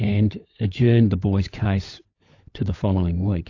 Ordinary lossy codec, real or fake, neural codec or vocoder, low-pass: AAC, 48 kbps; fake; codec, 16 kHz, 16 kbps, FunCodec, trained on LibriTTS, 50 frames a second; 7.2 kHz